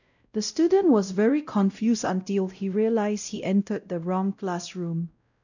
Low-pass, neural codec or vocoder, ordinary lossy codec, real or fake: 7.2 kHz; codec, 16 kHz, 0.5 kbps, X-Codec, WavLM features, trained on Multilingual LibriSpeech; none; fake